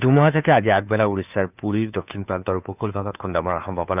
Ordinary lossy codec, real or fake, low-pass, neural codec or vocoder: none; fake; 3.6 kHz; codec, 16 kHz, 2 kbps, FunCodec, trained on Chinese and English, 25 frames a second